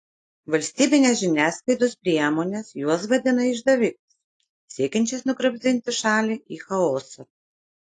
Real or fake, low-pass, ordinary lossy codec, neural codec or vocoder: real; 10.8 kHz; AAC, 48 kbps; none